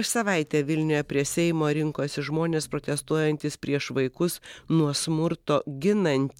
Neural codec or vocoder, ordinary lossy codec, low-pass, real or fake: none; MP3, 96 kbps; 19.8 kHz; real